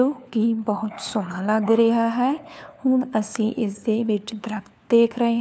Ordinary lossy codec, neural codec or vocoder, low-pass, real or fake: none; codec, 16 kHz, 4 kbps, FunCodec, trained on LibriTTS, 50 frames a second; none; fake